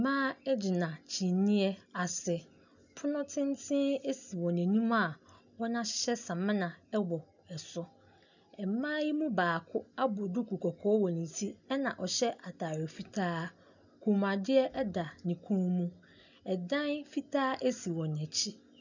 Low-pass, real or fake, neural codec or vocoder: 7.2 kHz; real; none